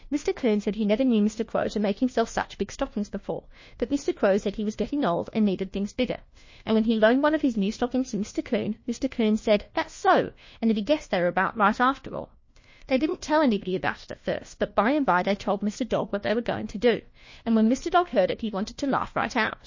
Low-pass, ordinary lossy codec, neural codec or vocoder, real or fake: 7.2 kHz; MP3, 32 kbps; codec, 16 kHz, 1 kbps, FunCodec, trained on LibriTTS, 50 frames a second; fake